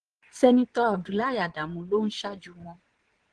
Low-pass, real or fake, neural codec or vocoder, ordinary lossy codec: 9.9 kHz; fake; vocoder, 22.05 kHz, 80 mel bands, Vocos; Opus, 16 kbps